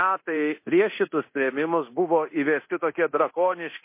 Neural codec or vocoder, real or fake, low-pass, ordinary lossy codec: codec, 24 kHz, 0.9 kbps, DualCodec; fake; 3.6 kHz; MP3, 24 kbps